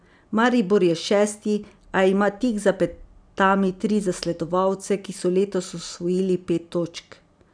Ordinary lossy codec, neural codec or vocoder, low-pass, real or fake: none; none; 9.9 kHz; real